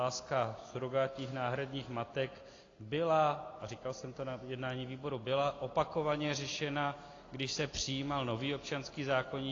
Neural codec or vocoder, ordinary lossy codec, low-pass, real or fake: none; AAC, 32 kbps; 7.2 kHz; real